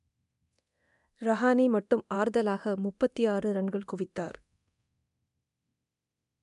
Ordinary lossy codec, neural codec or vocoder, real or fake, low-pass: none; codec, 24 kHz, 0.9 kbps, DualCodec; fake; 10.8 kHz